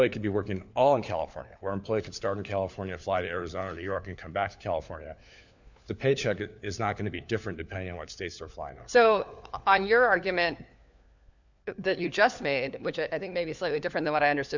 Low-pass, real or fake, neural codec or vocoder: 7.2 kHz; fake; codec, 16 kHz, 4 kbps, FunCodec, trained on LibriTTS, 50 frames a second